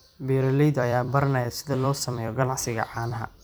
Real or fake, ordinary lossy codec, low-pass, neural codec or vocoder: fake; none; none; vocoder, 44.1 kHz, 128 mel bands every 256 samples, BigVGAN v2